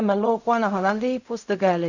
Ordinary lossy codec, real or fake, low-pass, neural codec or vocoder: none; fake; 7.2 kHz; codec, 16 kHz in and 24 kHz out, 0.4 kbps, LongCat-Audio-Codec, fine tuned four codebook decoder